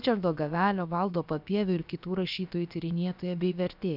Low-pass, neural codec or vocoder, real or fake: 5.4 kHz; codec, 16 kHz, about 1 kbps, DyCAST, with the encoder's durations; fake